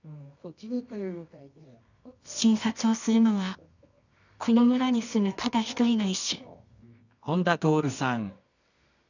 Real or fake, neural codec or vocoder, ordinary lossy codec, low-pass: fake; codec, 24 kHz, 0.9 kbps, WavTokenizer, medium music audio release; none; 7.2 kHz